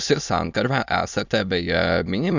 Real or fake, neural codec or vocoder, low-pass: fake; autoencoder, 22.05 kHz, a latent of 192 numbers a frame, VITS, trained on many speakers; 7.2 kHz